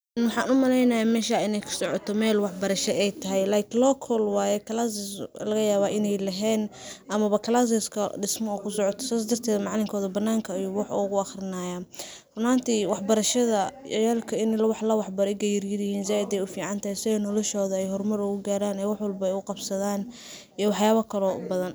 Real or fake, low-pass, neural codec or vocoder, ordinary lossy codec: real; none; none; none